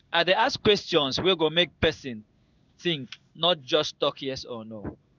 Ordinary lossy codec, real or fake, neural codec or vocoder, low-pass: none; fake; codec, 16 kHz in and 24 kHz out, 1 kbps, XY-Tokenizer; 7.2 kHz